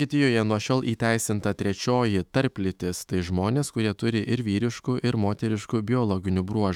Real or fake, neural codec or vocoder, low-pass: fake; autoencoder, 48 kHz, 128 numbers a frame, DAC-VAE, trained on Japanese speech; 19.8 kHz